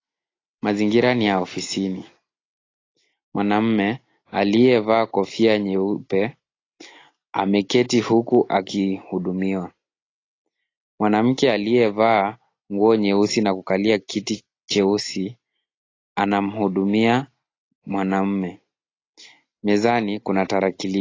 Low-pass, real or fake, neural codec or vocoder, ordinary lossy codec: 7.2 kHz; real; none; AAC, 32 kbps